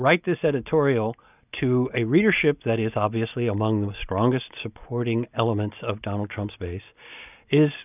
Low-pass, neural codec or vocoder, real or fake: 3.6 kHz; none; real